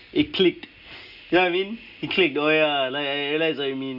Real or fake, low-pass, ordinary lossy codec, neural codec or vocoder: real; 5.4 kHz; Opus, 64 kbps; none